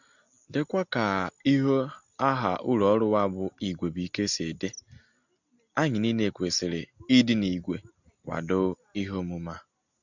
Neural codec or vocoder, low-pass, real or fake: none; 7.2 kHz; real